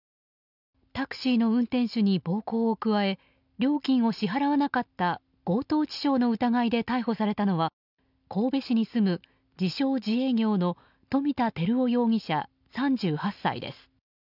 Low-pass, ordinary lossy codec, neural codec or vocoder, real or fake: 5.4 kHz; none; none; real